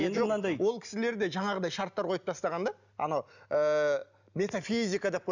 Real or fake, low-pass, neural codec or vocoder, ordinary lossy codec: real; 7.2 kHz; none; none